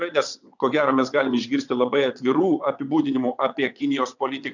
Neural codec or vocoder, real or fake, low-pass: vocoder, 22.05 kHz, 80 mel bands, WaveNeXt; fake; 7.2 kHz